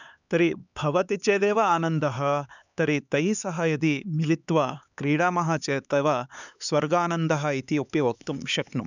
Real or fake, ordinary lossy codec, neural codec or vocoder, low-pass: fake; none; codec, 16 kHz, 4 kbps, X-Codec, HuBERT features, trained on LibriSpeech; 7.2 kHz